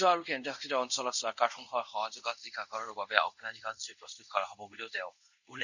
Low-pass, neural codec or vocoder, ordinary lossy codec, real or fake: 7.2 kHz; codec, 24 kHz, 0.5 kbps, DualCodec; none; fake